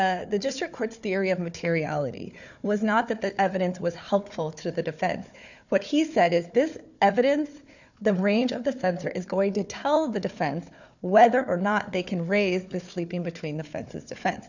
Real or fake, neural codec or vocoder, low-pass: fake; codec, 16 kHz, 4 kbps, FunCodec, trained on Chinese and English, 50 frames a second; 7.2 kHz